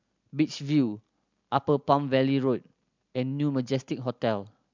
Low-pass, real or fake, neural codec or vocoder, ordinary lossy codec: 7.2 kHz; real; none; MP3, 64 kbps